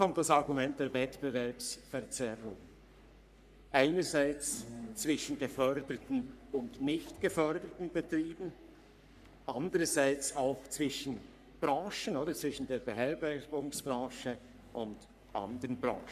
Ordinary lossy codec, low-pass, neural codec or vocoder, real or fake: none; 14.4 kHz; codec, 44.1 kHz, 3.4 kbps, Pupu-Codec; fake